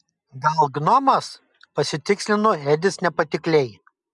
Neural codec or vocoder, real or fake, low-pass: none; real; 10.8 kHz